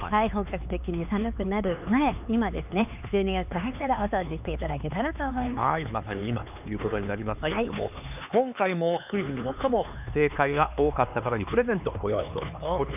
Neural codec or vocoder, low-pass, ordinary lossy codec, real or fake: codec, 16 kHz, 4 kbps, X-Codec, HuBERT features, trained on LibriSpeech; 3.6 kHz; none; fake